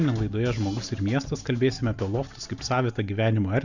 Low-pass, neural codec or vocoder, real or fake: 7.2 kHz; none; real